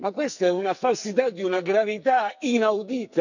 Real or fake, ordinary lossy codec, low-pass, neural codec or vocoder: fake; none; 7.2 kHz; codec, 44.1 kHz, 2.6 kbps, SNAC